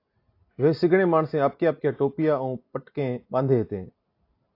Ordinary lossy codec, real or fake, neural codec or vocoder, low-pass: AAC, 32 kbps; real; none; 5.4 kHz